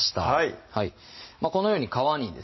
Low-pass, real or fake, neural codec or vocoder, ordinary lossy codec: 7.2 kHz; real; none; MP3, 24 kbps